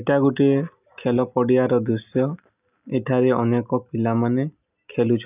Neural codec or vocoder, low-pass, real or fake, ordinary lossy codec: none; 3.6 kHz; real; none